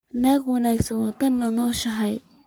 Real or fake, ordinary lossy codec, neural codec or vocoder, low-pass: fake; none; codec, 44.1 kHz, 3.4 kbps, Pupu-Codec; none